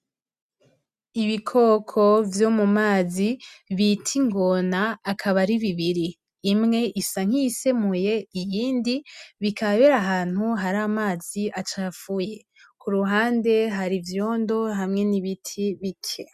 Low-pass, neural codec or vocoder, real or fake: 14.4 kHz; none; real